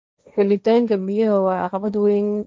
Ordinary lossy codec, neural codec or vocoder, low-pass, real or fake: none; codec, 16 kHz, 1.1 kbps, Voila-Tokenizer; none; fake